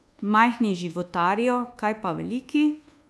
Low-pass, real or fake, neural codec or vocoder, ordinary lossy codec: none; fake; codec, 24 kHz, 1.2 kbps, DualCodec; none